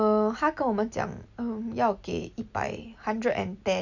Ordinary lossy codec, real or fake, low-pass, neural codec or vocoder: none; real; 7.2 kHz; none